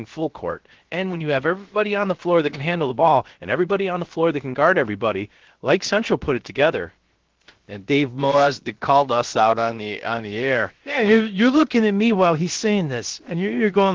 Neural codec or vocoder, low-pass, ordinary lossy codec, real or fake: codec, 16 kHz, about 1 kbps, DyCAST, with the encoder's durations; 7.2 kHz; Opus, 16 kbps; fake